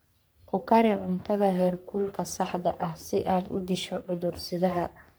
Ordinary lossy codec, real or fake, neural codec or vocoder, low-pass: none; fake; codec, 44.1 kHz, 3.4 kbps, Pupu-Codec; none